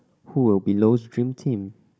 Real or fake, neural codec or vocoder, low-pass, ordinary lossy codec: fake; codec, 16 kHz, 16 kbps, FunCodec, trained on Chinese and English, 50 frames a second; none; none